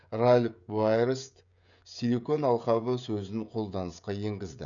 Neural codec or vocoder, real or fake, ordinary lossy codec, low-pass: codec, 16 kHz, 16 kbps, FreqCodec, smaller model; fake; none; 7.2 kHz